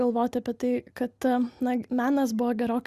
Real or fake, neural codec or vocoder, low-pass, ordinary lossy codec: real; none; 14.4 kHz; Opus, 64 kbps